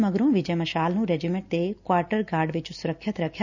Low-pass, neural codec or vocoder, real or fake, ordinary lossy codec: 7.2 kHz; none; real; none